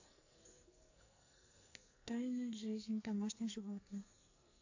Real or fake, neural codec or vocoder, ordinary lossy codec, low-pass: fake; codec, 44.1 kHz, 2.6 kbps, SNAC; AAC, 48 kbps; 7.2 kHz